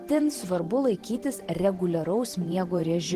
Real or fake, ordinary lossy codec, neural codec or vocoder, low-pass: fake; Opus, 16 kbps; vocoder, 44.1 kHz, 128 mel bands every 512 samples, BigVGAN v2; 14.4 kHz